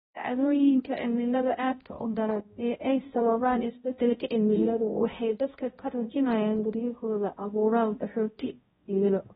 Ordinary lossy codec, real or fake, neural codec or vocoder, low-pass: AAC, 16 kbps; fake; codec, 16 kHz, 0.5 kbps, X-Codec, HuBERT features, trained on balanced general audio; 7.2 kHz